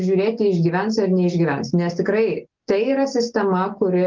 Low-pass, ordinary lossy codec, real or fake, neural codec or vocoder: 7.2 kHz; Opus, 24 kbps; real; none